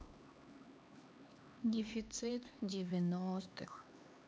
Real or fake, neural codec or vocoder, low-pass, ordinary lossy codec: fake; codec, 16 kHz, 2 kbps, X-Codec, HuBERT features, trained on LibriSpeech; none; none